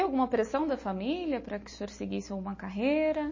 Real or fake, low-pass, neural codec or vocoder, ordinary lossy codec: real; 7.2 kHz; none; MP3, 32 kbps